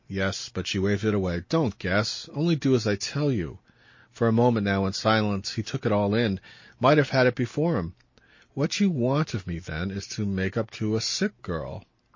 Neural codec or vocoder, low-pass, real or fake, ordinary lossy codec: none; 7.2 kHz; real; MP3, 32 kbps